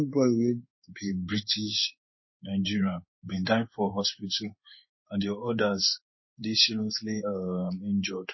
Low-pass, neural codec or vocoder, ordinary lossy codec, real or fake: 7.2 kHz; codec, 16 kHz in and 24 kHz out, 1 kbps, XY-Tokenizer; MP3, 24 kbps; fake